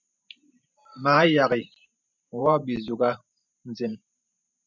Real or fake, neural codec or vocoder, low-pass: fake; vocoder, 44.1 kHz, 128 mel bands every 512 samples, BigVGAN v2; 7.2 kHz